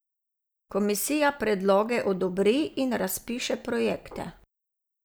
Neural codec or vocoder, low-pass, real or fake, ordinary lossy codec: none; none; real; none